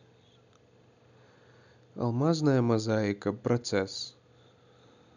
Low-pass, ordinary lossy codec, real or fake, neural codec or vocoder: 7.2 kHz; none; real; none